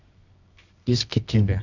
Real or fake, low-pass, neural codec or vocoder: fake; 7.2 kHz; codec, 24 kHz, 0.9 kbps, WavTokenizer, medium music audio release